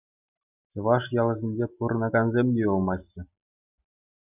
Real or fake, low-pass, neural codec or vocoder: real; 3.6 kHz; none